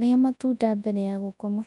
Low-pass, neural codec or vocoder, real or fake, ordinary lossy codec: 10.8 kHz; codec, 24 kHz, 0.9 kbps, WavTokenizer, large speech release; fake; none